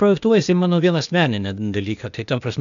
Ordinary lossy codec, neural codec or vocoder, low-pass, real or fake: MP3, 96 kbps; codec, 16 kHz, 0.8 kbps, ZipCodec; 7.2 kHz; fake